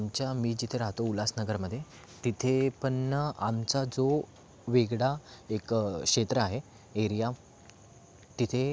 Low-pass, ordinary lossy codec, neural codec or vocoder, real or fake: none; none; none; real